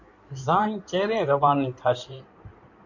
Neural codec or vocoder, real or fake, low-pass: codec, 16 kHz in and 24 kHz out, 2.2 kbps, FireRedTTS-2 codec; fake; 7.2 kHz